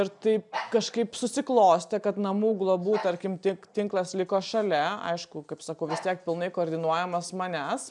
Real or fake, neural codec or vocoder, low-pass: real; none; 10.8 kHz